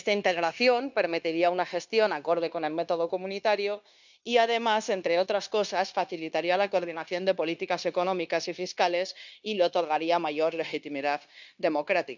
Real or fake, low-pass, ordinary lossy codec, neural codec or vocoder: fake; 7.2 kHz; none; codec, 16 kHz, 0.9 kbps, LongCat-Audio-Codec